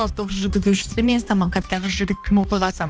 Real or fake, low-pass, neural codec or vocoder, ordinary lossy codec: fake; none; codec, 16 kHz, 1 kbps, X-Codec, HuBERT features, trained on balanced general audio; none